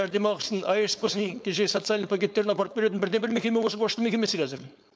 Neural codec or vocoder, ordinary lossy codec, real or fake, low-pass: codec, 16 kHz, 4.8 kbps, FACodec; none; fake; none